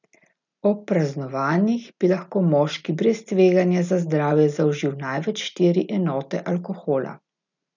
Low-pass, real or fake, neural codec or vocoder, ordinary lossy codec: 7.2 kHz; real; none; none